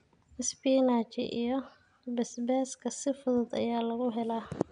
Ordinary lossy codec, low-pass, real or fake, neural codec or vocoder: none; 9.9 kHz; real; none